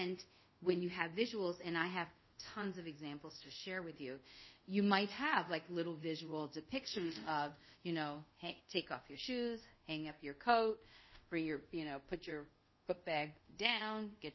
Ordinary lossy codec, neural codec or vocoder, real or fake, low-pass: MP3, 24 kbps; codec, 24 kHz, 0.5 kbps, DualCodec; fake; 7.2 kHz